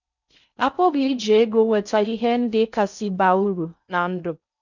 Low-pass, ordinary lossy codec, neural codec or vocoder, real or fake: 7.2 kHz; none; codec, 16 kHz in and 24 kHz out, 0.6 kbps, FocalCodec, streaming, 4096 codes; fake